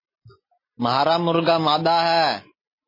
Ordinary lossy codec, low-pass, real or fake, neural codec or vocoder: MP3, 24 kbps; 5.4 kHz; real; none